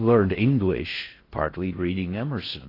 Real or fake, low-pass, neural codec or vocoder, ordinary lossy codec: fake; 5.4 kHz; codec, 16 kHz in and 24 kHz out, 0.6 kbps, FocalCodec, streaming, 4096 codes; AAC, 24 kbps